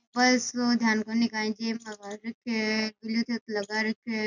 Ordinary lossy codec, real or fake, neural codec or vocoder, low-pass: none; real; none; 7.2 kHz